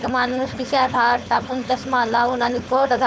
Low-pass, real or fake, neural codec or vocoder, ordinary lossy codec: none; fake; codec, 16 kHz, 4.8 kbps, FACodec; none